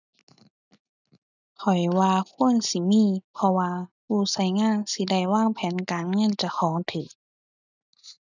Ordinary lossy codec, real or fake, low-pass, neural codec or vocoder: none; real; 7.2 kHz; none